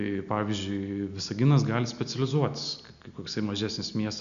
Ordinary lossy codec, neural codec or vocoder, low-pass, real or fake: AAC, 96 kbps; none; 7.2 kHz; real